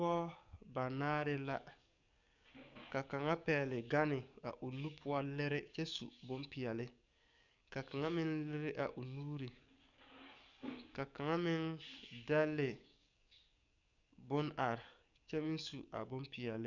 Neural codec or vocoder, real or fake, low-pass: codec, 44.1 kHz, 7.8 kbps, DAC; fake; 7.2 kHz